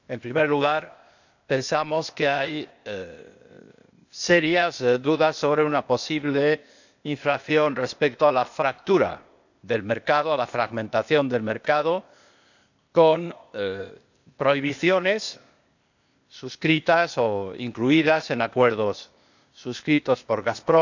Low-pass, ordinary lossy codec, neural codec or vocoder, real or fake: 7.2 kHz; none; codec, 16 kHz, 0.8 kbps, ZipCodec; fake